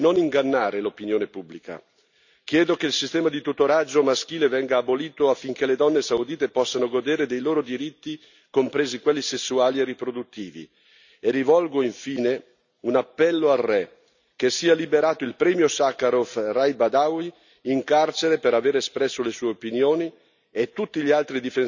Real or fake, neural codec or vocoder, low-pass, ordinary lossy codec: real; none; 7.2 kHz; none